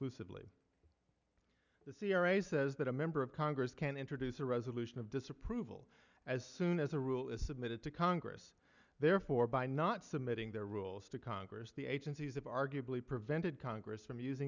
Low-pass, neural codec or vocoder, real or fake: 7.2 kHz; none; real